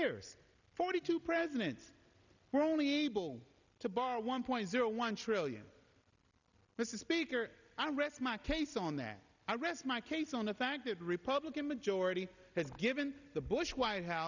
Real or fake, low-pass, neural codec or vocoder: real; 7.2 kHz; none